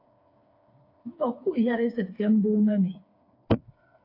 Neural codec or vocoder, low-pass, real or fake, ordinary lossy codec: codec, 16 kHz, 4 kbps, FreqCodec, smaller model; 5.4 kHz; fake; AAC, 32 kbps